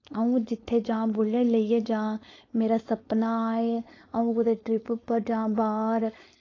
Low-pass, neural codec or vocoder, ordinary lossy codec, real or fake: 7.2 kHz; codec, 16 kHz, 4.8 kbps, FACodec; AAC, 32 kbps; fake